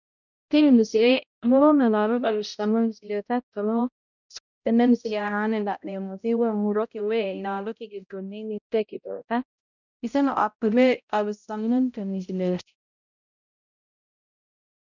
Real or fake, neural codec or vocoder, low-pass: fake; codec, 16 kHz, 0.5 kbps, X-Codec, HuBERT features, trained on balanced general audio; 7.2 kHz